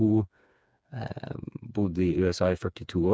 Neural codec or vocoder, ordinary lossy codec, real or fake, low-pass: codec, 16 kHz, 4 kbps, FreqCodec, smaller model; none; fake; none